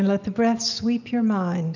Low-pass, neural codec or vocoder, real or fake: 7.2 kHz; none; real